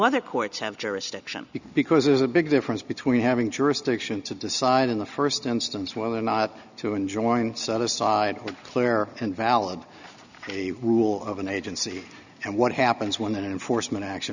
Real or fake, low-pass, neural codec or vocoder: real; 7.2 kHz; none